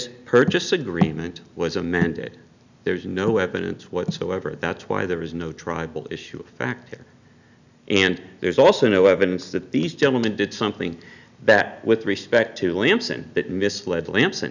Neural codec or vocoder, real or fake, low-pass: none; real; 7.2 kHz